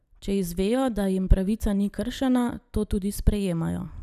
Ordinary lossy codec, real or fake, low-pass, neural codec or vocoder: none; real; 14.4 kHz; none